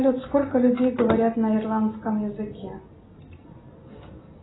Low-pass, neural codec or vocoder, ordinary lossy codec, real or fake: 7.2 kHz; none; AAC, 16 kbps; real